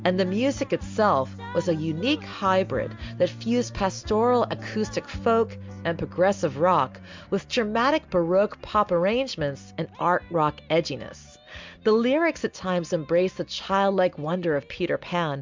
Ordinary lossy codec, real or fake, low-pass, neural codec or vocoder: MP3, 64 kbps; real; 7.2 kHz; none